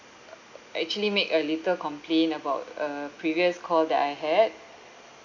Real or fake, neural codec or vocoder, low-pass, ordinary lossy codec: real; none; 7.2 kHz; none